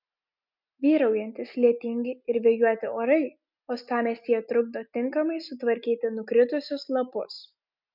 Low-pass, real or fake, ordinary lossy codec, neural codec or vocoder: 5.4 kHz; real; MP3, 48 kbps; none